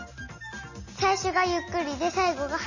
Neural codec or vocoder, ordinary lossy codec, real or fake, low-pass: none; none; real; 7.2 kHz